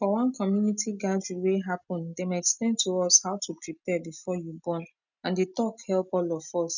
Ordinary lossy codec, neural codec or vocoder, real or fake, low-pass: none; none; real; 7.2 kHz